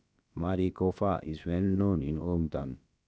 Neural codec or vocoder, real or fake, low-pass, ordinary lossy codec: codec, 16 kHz, about 1 kbps, DyCAST, with the encoder's durations; fake; none; none